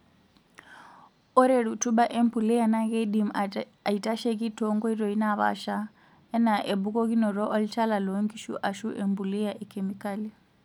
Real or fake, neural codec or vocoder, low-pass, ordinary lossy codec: real; none; 19.8 kHz; none